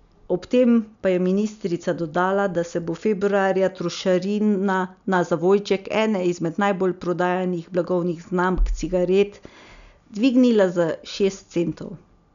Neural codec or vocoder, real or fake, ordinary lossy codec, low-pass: none; real; none; 7.2 kHz